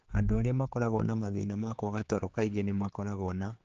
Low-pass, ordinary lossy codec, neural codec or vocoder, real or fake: 7.2 kHz; Opus, 16 kbps; codec, 16 kHz, 4 kbps, X-Codec, HuBERT features, trained on general audio; fake